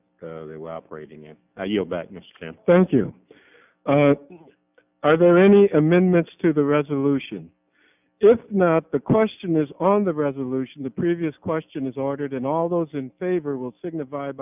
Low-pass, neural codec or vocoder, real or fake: 3.6 kHz; none; real